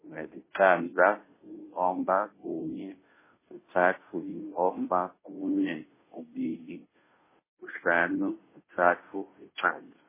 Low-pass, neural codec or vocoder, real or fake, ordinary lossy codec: 3.6 kHz; codec, 16 kHz, 0.5 kbps, FunCodec, trained on Chinese and English, 25 frames a second; fake; MP3, 16 kbps